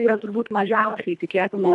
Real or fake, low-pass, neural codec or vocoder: fake; 10.8 kHz; codec, 24 kHz, 1.5 kbps, HILCodec